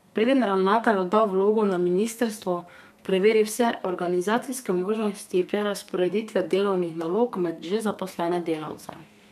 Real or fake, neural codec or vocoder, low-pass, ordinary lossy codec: fake; codec, 32 kHz, 1.9 kbps, SNAC; 14.4 kHz; none